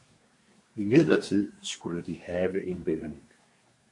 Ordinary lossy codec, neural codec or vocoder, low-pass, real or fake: MP3, 48 kbps; codec, 24 kHz, 1 kbps, SNAC; 10.8 kHz; fake